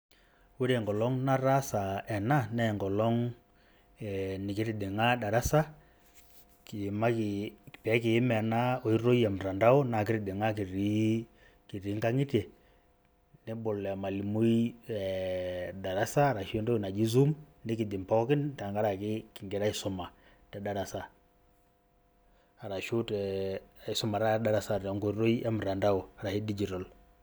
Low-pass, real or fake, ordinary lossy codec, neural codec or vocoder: none; real; none; none